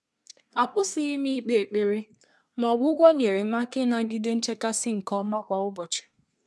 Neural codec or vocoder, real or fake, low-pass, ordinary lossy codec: codec, 24 kHz, 1 kbps, SNAC; fake; none; none